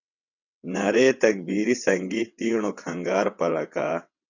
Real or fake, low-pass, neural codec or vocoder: fake; 7.2 kHz; vocoder, 22.05 kHz, 80 mel bands, WaveNeXt